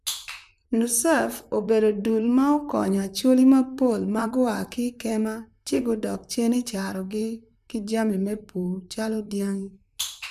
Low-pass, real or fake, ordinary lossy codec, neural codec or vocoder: 14.4 kHz; fake; none; vocoder, 44.1 kHz, 128 mel bands, Pupu-Vocoder